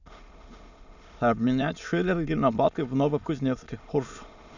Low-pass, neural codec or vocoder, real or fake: 7.2 kHz; autoencoder, 22.05 kHz, a latent of 192 numbers a frame, VITS, trained on many speakers; fake